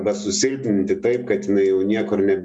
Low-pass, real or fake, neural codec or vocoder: 10.8 kHz; real; none